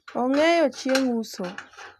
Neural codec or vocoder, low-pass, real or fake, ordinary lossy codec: none; 14.4 kHz; real; none